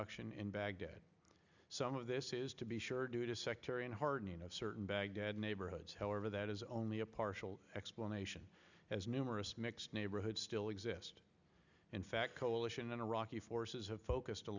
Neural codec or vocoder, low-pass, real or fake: none; 7.2 kHz; real